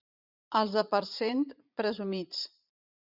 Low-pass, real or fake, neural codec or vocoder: 5.4 kHz; fake; vocoder, 44.1 kHz, 80 mel bands, Vocos